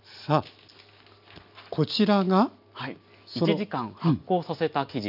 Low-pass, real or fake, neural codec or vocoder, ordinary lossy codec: 5.4 kHz; real; none; none